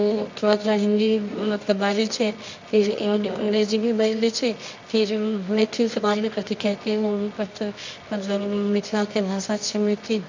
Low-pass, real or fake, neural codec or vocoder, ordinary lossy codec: 7.2 kHz; fake; codec, 24 kHz, 0.9 kbps, WavTokenizer, medium music audio release; MP3, 64 kbps